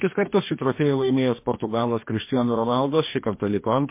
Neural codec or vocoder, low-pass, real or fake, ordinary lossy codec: codec, 44.1 kHz, 2.6 kbps, DAC; 3.6 kHz; fake; MP3, 24 kbps